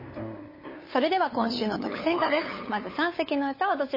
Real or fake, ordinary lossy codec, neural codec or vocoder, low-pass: fake; MP3, 24 kbps; codec, 16 kHz, 8 kbps, FunCodec, trained on LibriTTS, 25 frames a second; 5.4 kHz